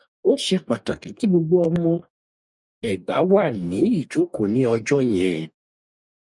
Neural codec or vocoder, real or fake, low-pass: codec, 44.1 kHz, 2.6 kbps, DAC; fake; 10.8 kHz